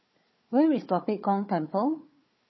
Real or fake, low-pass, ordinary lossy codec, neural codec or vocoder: fake; 7.2 kHz; MP3, 24 kbps; codec, 16 kHz, 4 kbps, FunCodec, trained on Chinese and English, 50 frames a second